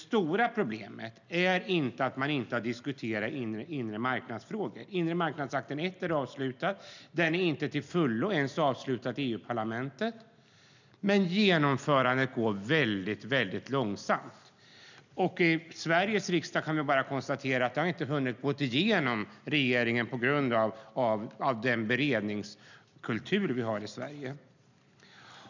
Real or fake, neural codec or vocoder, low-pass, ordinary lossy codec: real; none; 7.2 kHz; none